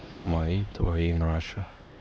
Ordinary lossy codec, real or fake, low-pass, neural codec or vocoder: none; fake; none; codec, 16 kHz, 2 kbps, X-Codec, HuBERT features, trained on LibriSpeech